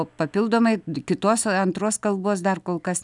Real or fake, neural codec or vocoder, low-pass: real; none; 10.8 kHz